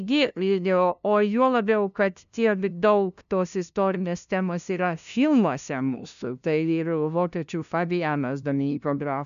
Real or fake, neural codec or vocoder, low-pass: fake; codec, 16 kHz, 0.5 kbps, FunCodec, trained on LibriTTS, 25 frames a second; 7.2 kHz